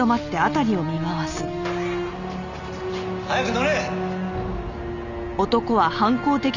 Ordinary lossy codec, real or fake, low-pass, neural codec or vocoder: none; real; 7.2 kHz; none